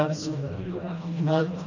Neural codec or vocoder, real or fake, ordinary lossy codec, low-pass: codec, 16 kHz, 1 kbps, FreqCodec, smaller model; fake; none; 7.2 kHz